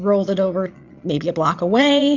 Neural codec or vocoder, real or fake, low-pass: vocoder, 22.05 kHz, 80 mel bands, Vocos; fake; 7.2 kHz